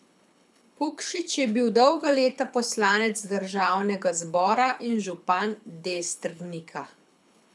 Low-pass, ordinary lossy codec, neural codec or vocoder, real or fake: none; none; codec, 24 kHz, 6 kbps, HILCodec; fake